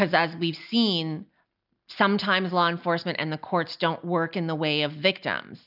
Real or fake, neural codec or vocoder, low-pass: real; none; 5.4 kHz